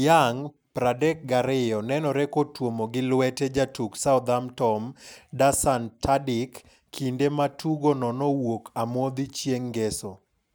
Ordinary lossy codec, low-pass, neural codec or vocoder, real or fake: none; none; none; real